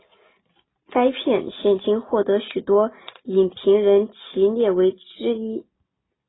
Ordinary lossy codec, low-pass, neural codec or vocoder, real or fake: AAC, 16 kbps; 7.2 kHz; none; real